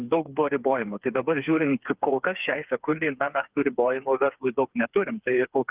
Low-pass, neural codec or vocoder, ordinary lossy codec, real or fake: 3.6 kHz; codec, 16 kHz, 4 kbps, FreqCodec, smaller model; Opus, 32 kbps; fake